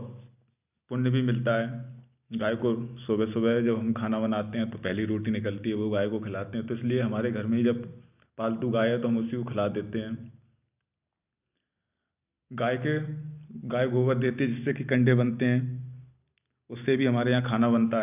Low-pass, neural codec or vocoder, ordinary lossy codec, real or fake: 3.6 kHz; none; none; real